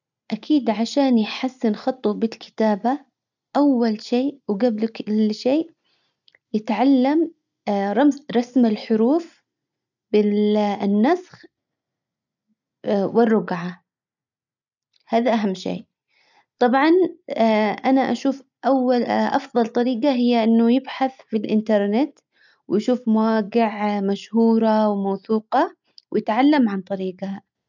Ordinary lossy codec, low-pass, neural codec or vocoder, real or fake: none; 7.2 kHz; none; real